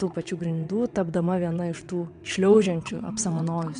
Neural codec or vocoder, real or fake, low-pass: vocoder, 22.05 kHz, 80 mel bands, WaveNeXt; fake; 9.9 kHz